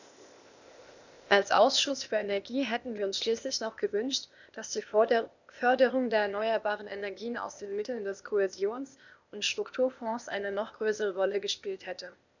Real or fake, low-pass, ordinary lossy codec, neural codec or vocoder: fake; 7.2 kHz; none; codec, 16 kHz, 0.8 kbps, ZipCodec